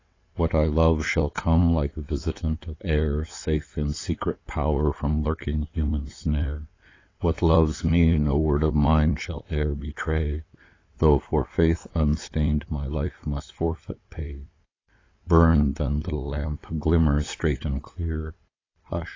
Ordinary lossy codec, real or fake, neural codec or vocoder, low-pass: AAC, 32 kbps; fake; vocoder, 22.05 kHz, 80 mel bands, WaveNeXt; 7.2 kHz